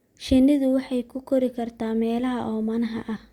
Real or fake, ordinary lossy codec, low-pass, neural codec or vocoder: real; Opus, 64 kbps; 19.8 kHz; none